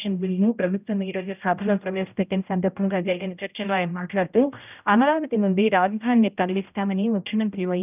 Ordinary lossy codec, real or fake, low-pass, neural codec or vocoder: none; fake; 3.6 kHz; codec, 16 kHz, 0.5 kbps, X-Codec, HuBERT features, trained on general audio